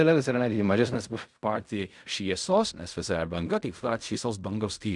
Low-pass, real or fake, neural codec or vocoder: 10.8 kHz; fake; codec, 16 kHz in and 24 kHz out, 0.4 kbps, LongCat-Audio-Codec, fine tuned four codebook decoder